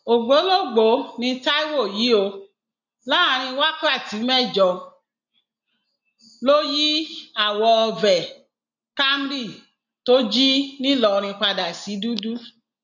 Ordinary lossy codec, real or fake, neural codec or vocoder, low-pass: none; real; none; 7.2 kHz